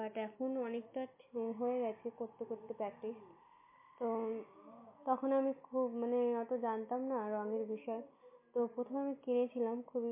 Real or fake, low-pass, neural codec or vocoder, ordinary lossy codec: real; 3.6 kHz; none; MP3, 24 kbps